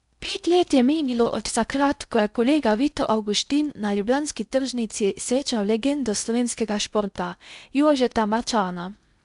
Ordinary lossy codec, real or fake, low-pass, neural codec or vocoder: none; fake; 10.8 kHz; codec, 16 kHz in and 24 kHz out, 0.6 kbps, FocalCodec, streaming, 4096 codes